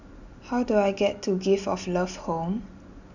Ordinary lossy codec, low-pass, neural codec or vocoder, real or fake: none; 7.2 kHz; none; real